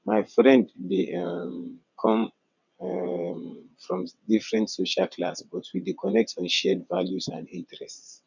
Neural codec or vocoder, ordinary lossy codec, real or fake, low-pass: vocoder, 22.05 kHz, 80 mel bands, WaveNeXt; none; fake; 7.2 kHz